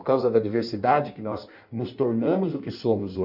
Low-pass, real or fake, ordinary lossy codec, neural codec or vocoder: 5.4 kHz; fake; MP3, 48 kbps; codec, 16 kHz in and 24 kHz out, 1.1 kbps, FireRedTTS-2 codec